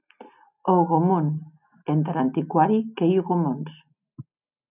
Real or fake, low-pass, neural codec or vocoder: real; 3.6 kHz; none